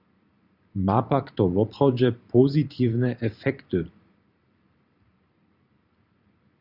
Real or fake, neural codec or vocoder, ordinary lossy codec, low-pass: fake; vocoder, 44.1 kHz, 128 mel bands every 512 samples, BigVGAN v2; Opus, 64 kbps; 5.4 kHz